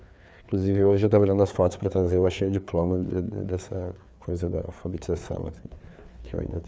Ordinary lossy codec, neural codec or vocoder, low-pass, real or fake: none; codec, 16 kHz, 4 kbps, FreqCodec, larger model; none; fake